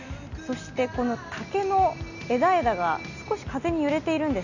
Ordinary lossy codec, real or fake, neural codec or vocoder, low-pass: none; real; none; 7.2 kHz